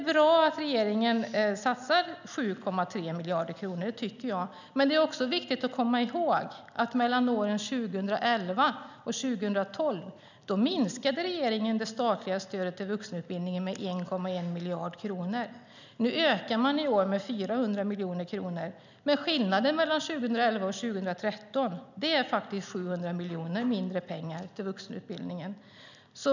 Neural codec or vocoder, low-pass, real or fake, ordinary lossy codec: none; 7.2 kHz; real; none